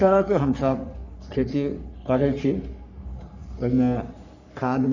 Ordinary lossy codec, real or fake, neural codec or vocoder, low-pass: none; fake; codec, 44.1 kHz, 3.4 kbps, Pupu-Codec; 7.2 kHz